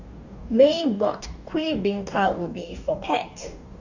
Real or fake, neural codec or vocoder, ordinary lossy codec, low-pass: fake; codec, 44.1 kHz, 2.6 kbps, DAC; none; 7.2 kHz